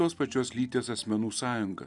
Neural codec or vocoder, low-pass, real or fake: none; 10.8 kHz; real